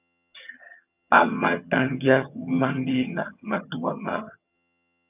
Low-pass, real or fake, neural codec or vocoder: 3.6 kHz; fake; vocoder, 22.05 kHz, 80 mel bands, HiFi-GAN